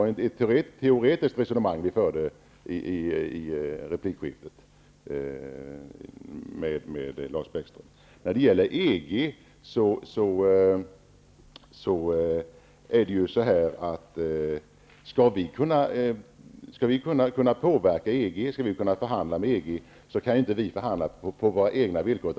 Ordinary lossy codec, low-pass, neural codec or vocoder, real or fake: none; none; none; real